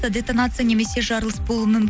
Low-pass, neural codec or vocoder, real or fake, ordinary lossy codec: none; none; real; none